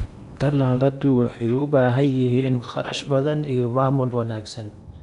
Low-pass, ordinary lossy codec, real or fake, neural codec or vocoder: 10.8 kHz; none; fake; codec, 16 kHz in and 24 kHz out, 0.8 kbps, FocalCodec, streaming, 65536 codes